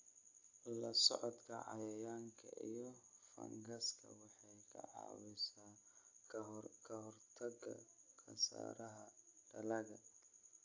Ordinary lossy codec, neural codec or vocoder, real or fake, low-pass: AAC, 48 kbps; none; real; 7.2 kHz